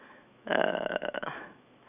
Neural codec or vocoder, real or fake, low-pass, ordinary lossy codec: autoencoder, 48 kHz, 128 numbers a frame, DAC-VAE, trained on Japanese speech; fake; 3.6 kHz; none